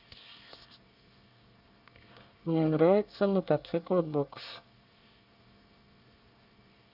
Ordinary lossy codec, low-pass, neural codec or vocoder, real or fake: none; 5.4 kHz; codec, 24 kHz, 1 kbps, SNAC; fake